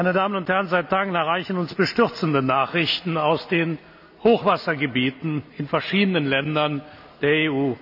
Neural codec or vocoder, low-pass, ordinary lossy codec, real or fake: none; 5.4 kHz; none; real